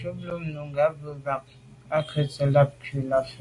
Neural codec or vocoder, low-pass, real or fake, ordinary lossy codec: none; 10.8 kHz; real; AAC, 48 kbps